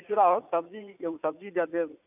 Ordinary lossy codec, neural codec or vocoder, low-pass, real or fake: none; vocoder, 44.1 kHz, 80 mel bands, Vocos; 3.6 kHz; fake